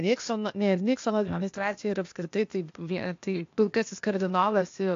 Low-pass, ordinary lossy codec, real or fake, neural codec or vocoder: 7.2 kHz; AAC, 48 kbps; fake; codec, 16 kHz, 0.8 kbps, ZipCodec